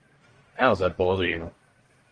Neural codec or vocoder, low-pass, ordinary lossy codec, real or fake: codec, 44.1 kHz, 1.7 kbps, Pupu-Codec; 9.9 kHz; Opus, 24 kbps; fake